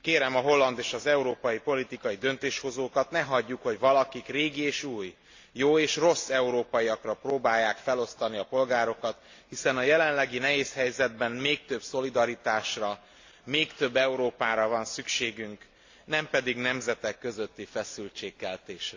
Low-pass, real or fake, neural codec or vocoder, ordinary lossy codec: 7.2 kHz; real; none; AAC, 48 kbps